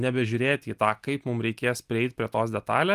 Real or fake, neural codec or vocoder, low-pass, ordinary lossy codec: real; none; 14.4 kHz; Opus, 32 kbps